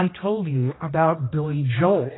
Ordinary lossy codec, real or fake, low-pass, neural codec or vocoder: AAC, 16 kbps; fake; 7.2 kHz; codec, 16 kHz, 1 kbps, X-Codec, HuBERT features, trained on general audio